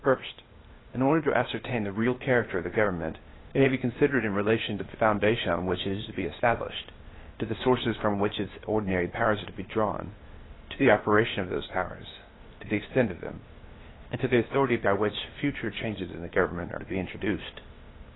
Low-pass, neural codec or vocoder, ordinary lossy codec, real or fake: 7.2 kHz; codec, 16 kHz, 0.8 kbps, ZipCodec; AAC, 16 kbps; fake